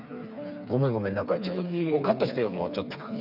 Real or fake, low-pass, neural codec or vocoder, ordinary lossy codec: fake; 5.4 kHz; codec, 16 kHz, 4 kbps, FreqCodec, smaller model; none